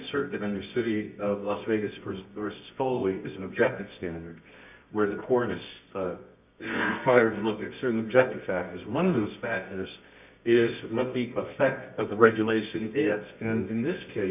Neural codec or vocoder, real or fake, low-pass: codec, 24 kHz, 0.9 kbps, WavTokenizer, medium music audio release; fake; 3.6 kHz